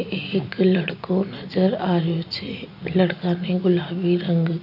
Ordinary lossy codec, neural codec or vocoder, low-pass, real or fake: none; none; 5.4 kHz; real